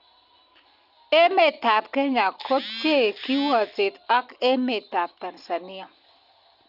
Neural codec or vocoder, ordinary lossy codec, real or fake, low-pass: none; Opus, 64 kbps; real; 5.4 kHz